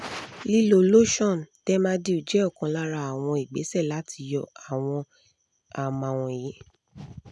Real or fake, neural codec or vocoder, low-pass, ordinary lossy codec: real; none; none; none